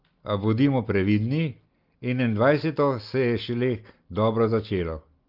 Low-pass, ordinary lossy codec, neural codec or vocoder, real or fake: 5.4 kHz; Opus, 32 kbps; none; real